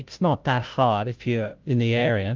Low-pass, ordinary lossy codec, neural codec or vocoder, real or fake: 7.2 kHz; Opus, 32 kbps; codec, 16 kHz, 0.5 kbps, FunCodec, trained on Chinese and English, 25 frames a second; fake